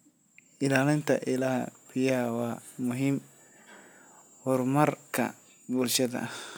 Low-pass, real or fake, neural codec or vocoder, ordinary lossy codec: none; real; none; none